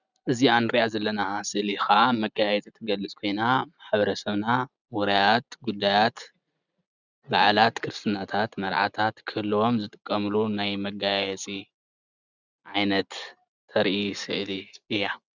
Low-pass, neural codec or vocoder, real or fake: 7.2 kHz; none; real